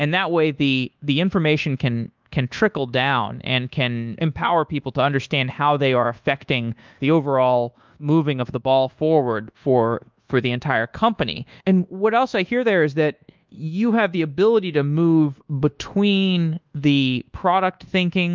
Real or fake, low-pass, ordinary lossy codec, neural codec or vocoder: fake; 7.2 kHz; Opus, 24 kbps; codec, 24 kHz, 1.2 kbps, DualCodec